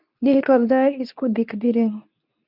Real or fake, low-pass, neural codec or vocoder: fake; 5.4 kHz; codec, 24 kHz, 0.9 kbps, WavTokenizer, medium speech release version 2